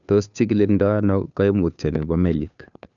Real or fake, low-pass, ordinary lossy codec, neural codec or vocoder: fake; 7.2 kHz; none; codec, 16 kHz, 2 kbps, FunCodec, trained on Chinese and English, 25 frames a second